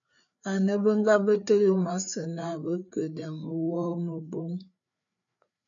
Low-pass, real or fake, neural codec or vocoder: 7.2 kHz; fake; codec, 16 kHz, 4 kbps, FreqCodec, larger model